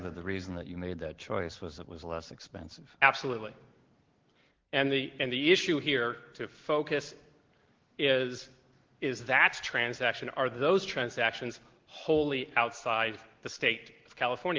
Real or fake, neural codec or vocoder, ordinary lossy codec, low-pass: real; none; Opus, 16 kbps; 7.2 kHz